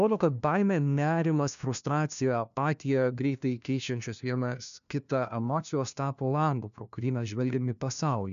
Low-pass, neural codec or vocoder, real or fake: 7.2 kHz; codec, 16 kHz, 1 kbps, FunCodec, trained on LibriTTS, 50 frames a second; fake